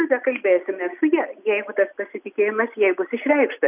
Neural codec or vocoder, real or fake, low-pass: none; real; 3.6 kHz